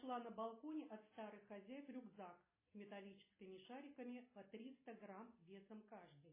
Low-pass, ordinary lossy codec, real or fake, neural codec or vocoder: 3.6 kHz; MP3, 16 kbps; real; none